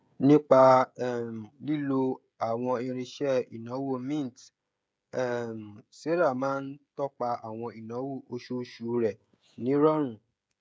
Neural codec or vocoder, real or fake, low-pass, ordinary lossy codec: codec, 16 kHz, 16 kbps, FreqCodec, smaller model; fake; none; none